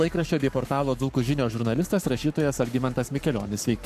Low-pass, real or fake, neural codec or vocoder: 14.4 kHz; fake; codec, 44.1 kHz, 7.8 kbps, Pupu-Codec